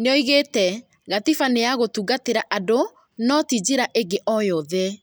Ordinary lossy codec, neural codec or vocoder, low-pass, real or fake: none; none; none; real